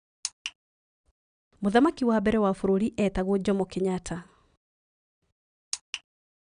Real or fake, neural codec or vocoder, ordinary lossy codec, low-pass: real; none; none; 9.9 kHz